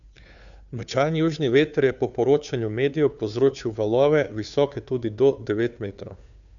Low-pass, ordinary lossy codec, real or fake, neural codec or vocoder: 7.2 kHz; none; fake; codec, 16 kHz, 2 kbps, FunCodec, trained on Chinese and English, 25 frames a second